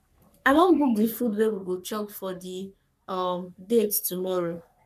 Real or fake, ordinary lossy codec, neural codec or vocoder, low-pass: fake; none; codec, 44.1 kHz, 3.4 kbps, Pupu-Codec; 14.4 kHz